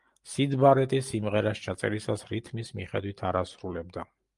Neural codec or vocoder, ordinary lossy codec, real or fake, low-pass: none; Opus, 32 kbps; real; 10.8 kHz